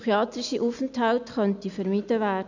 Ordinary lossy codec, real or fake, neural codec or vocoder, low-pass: none; real; none; 7.2 kHz